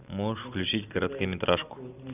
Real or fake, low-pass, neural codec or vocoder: real; 3.6 kHz; none